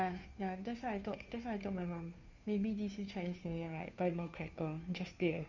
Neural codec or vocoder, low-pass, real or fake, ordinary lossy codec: codec, 16 kHz, 2 kbps, FunCodec, trained on Chinese and English, 25 frames a second; 7.2 kHz; fake; none